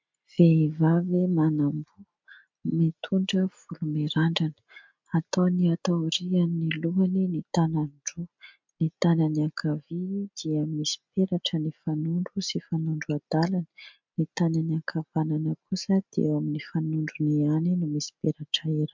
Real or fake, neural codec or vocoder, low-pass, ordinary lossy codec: real; none; 7.2 kHz; MP3, 64 kbps